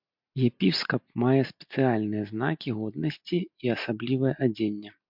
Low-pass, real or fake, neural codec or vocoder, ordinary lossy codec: 5.4 kHz; real; none; MP3, 48 kbps